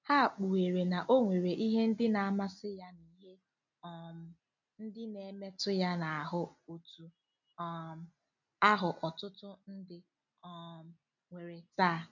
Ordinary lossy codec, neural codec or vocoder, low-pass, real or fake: none; none; 7.2 kHz; real